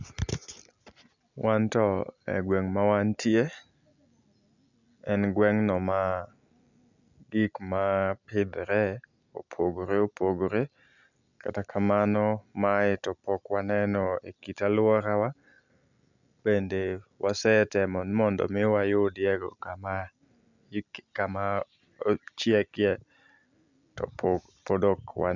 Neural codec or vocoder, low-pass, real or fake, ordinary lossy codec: none; 7.2 kHz; real; none